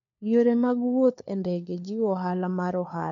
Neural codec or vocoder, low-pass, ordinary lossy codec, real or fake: codec, 16 kHz, 4 kbps, FunCodec, trained on LibriTTS, 50 frames a second; 7.2 kHz; none; fake